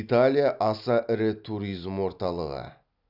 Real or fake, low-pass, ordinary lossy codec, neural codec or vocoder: real; 5.4 kHz; none; none